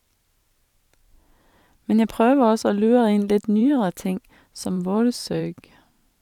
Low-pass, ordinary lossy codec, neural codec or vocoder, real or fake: 19.8 kHz; none; vocoder, 44.1 kHz, 128 mel bands every 512 samples, BigVGAN v2; fake